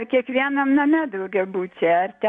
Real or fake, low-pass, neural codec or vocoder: real; 10.8 kHz; none